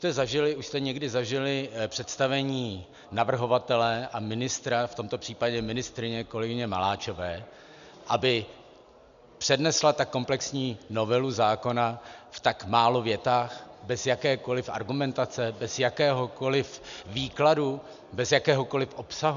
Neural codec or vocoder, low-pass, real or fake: none; 7.2 kHz; real